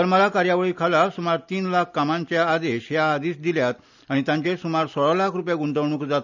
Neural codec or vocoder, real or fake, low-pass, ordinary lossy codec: none; real; 7.2 kHz; none